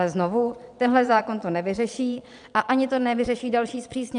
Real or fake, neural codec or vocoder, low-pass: fake; vocoder, 22.05 kHz, 80 mel bands, Vocos; 9.9 kHz